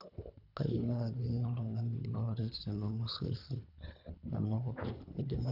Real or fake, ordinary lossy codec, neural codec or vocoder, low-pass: fake; none; codec, 24 kHz, 3 kbps, HILCodec; 5.4 kHz